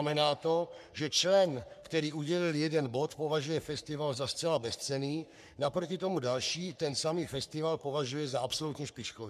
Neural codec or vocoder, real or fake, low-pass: codec, 44.1 kHz, 3.4 kbps, Pupu-Codec; fake; 14.4 kHz